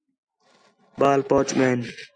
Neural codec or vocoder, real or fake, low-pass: none; real; 9.9 kHz